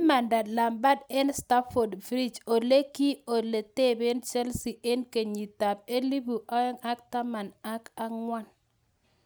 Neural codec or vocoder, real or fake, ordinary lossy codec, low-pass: none; real; none; none